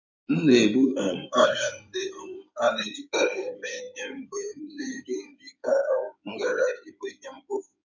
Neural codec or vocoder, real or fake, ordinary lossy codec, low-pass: codec, 16 kHz in and 24 kHz out, 2.2 kbps, FireRedTTS-2 codec; fake; none; 7.2 kHz